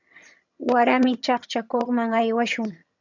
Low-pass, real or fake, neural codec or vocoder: 7.2 kHz; fake; vocoder, 22.05 kHz, 80 mel bands, HiFi-GAN